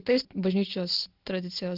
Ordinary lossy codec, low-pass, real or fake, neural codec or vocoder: Opus, 16 kbps; 5.4 kHz; real; none